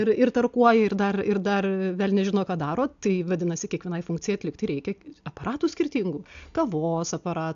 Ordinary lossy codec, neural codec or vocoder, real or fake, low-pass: AAC, 64 kbps; none; real; 7.2 kHz